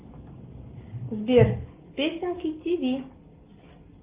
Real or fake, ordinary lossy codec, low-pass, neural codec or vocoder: real; Opus, 32 kbps; 3.6 kHz; none